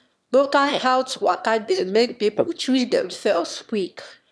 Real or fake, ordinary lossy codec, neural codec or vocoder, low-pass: fake; none; autoencoder, 22.05 kHz, a latent of 192 numbers a frame, VITS, trained on one speaker; none